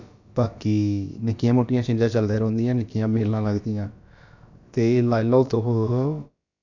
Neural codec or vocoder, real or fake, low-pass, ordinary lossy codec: codec, 16 kHz, about 1 kbps, DyCAST, with the encoder's durations; fake; 7.2 kHz; none